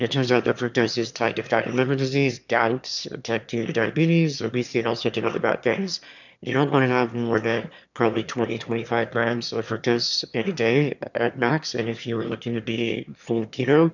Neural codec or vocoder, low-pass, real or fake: autoencoder, 22.05 kHz, a latent of 192 numbers a frame, VITS, trained on one speaker; 7.2 kHz; fake